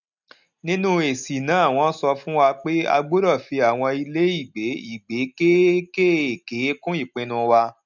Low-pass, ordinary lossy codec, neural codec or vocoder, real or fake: 7.2 kHz; none; none; real